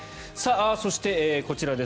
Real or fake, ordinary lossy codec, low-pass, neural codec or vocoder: real; none; none; none